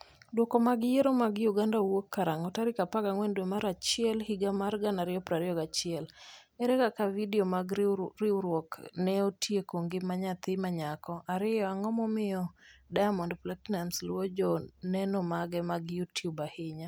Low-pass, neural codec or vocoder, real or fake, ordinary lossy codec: none; none; real; none